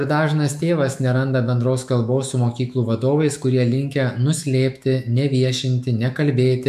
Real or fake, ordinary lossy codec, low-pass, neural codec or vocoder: fake; AAC, 96 kbps; 14.4 kHz; autoencoder, 48 kHz, 128 numbers a frame, DAC-VAE, trained on Japanese speech